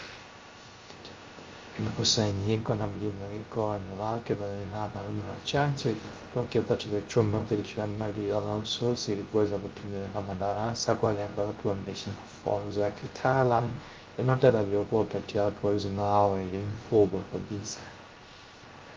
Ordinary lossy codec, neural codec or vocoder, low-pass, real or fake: Opus, 32 kbps; codec, 16 kHz, 0.3 kbps, FocalCodec; 7.2 kHz; fake